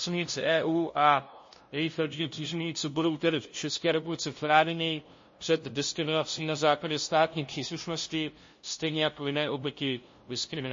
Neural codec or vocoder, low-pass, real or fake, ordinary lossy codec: codec, 16 kHz, 0.5 kbps, FunCodec, trained on LibriTTS, 25 frames a second; 7.2 kHz; fake; MP3, 32 kbps